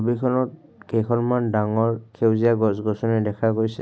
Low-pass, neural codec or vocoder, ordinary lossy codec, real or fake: none; none; none; real